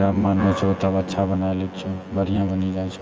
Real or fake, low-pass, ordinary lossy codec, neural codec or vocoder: fake; 7.2 kHz; Opus, 24 kbps; vocoder, 24 kHz, 100 mel bands, Vocos